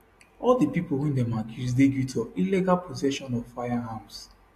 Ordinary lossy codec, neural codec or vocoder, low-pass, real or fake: AAC, 64 kbps; none; 14.4 kHz; real